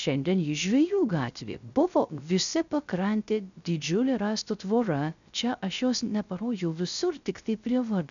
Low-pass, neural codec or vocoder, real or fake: 7.2 kHz; codec, 16 kHz, 0.3 kbps, FocalCodec; fake